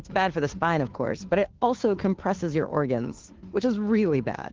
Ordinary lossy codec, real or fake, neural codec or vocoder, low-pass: Opus, 16 kbps; fake; codec, 16 kHz, 2 kbps, FunCodec, trained on Chinese and English, 25 frames a second; 7.2 kHz